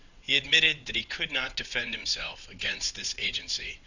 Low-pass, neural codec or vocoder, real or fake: 7.2 kHz; vocoder, 22.05 kHz, 80 mel bands, WaveNeXt; fake